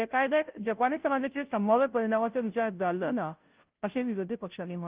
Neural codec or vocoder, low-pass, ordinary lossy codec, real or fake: codec, 16 kHz, 0.5 kbps, FunCodec, trained on Chinese and English, 25 frames a second; 3.6 kHz; Opus, 32 kbps; fake